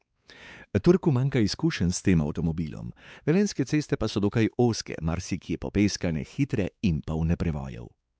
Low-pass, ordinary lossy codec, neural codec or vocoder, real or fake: none; none; codec, 16 kHz, 4 kbps, X-Codec, WavLM features, trained on Multilingual LibriSpeech; fake